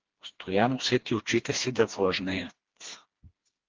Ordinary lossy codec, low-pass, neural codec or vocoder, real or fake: Opus, 16 kbps; 7.2 kHz; codec, 16 kHz, 2 kbps, FreqCodec, smaller model; fake